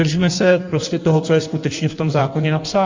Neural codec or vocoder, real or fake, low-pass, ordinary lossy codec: codec, 16 kHz in and 24 kHz out, 1.1 kbps, FireRedTTS-2 codec; fake; 7.2 kHz; MP3, 64 kbps